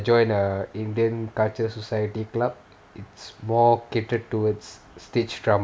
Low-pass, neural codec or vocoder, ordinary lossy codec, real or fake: none; none; none; real